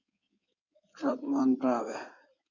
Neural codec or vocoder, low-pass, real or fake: codec, 16 kHz in and 24 kHz out, 2.2 kbps, FireRedTTS-2 codec; 7.2 kHz; fake